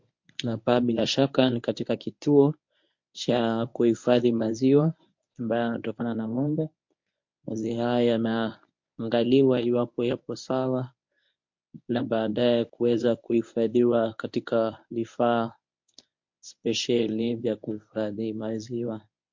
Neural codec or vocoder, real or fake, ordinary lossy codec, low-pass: codec, 24 kHz, 0.9 kbps, WavTokenizer, medium speech release version 1; fake; MP3, 48 kbps; 7.2 kHz